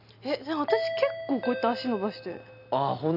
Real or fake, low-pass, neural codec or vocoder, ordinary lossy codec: real; 5.4 kHz; none; none